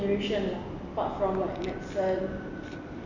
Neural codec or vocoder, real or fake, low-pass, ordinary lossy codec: none; real; 7.2 kHz; none